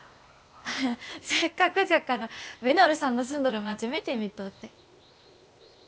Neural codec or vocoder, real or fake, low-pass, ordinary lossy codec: codec, 16 kHz, 0.8 kbps, ZipCodec; fake; none; none